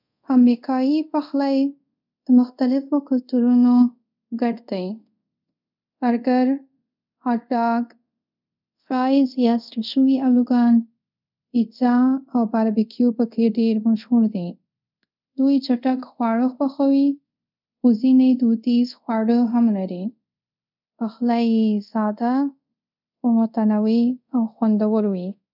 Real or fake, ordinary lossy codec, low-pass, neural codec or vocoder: fake; none; 5.4 kHz; codec, 24 kHz, 0.5 kbps, DualCodec